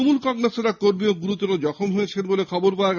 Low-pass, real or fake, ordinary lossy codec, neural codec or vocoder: 7.2 kHz; real; none; none